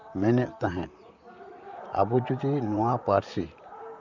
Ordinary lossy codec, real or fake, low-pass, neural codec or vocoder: none; fake; 7.2 kHz; vocoder, 44.1 kHz, 128 mel bands, Pupu-Vocoder